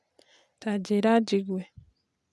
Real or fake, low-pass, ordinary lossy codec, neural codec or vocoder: real; none; none; none